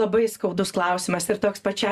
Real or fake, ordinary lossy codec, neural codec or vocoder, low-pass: real; Opus, 64 kbps; none; 14.4 kHz